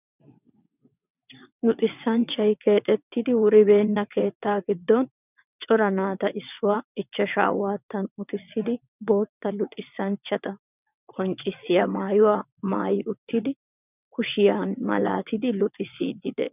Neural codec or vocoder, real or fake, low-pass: vocoder, 22.05 kHz, 80 mel bands, WaveNeXt; fake; 3.6 kHz